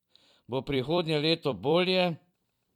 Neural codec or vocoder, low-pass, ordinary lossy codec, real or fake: vocoder, 44.1 kHz, 128 mel bands every 256 samples, BigVGAN v2; 19.8 kHz; none; fake